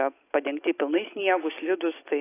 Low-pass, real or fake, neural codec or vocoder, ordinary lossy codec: 3.6 kHz; real; none; AAC, 16 kbps